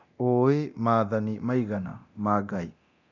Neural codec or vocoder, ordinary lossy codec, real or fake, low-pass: codec, 24 kHz, 0.9 kbps, DualCodec; none; fake; 7.2 kHz